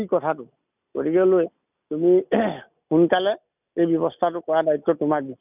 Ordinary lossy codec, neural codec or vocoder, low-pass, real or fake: none; none; 3.6 kHz; real